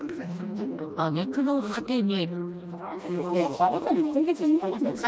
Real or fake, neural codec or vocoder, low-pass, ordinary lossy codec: fake; codec, 16 kHz, 1 kbps, FreqCodec, smaller model; none; none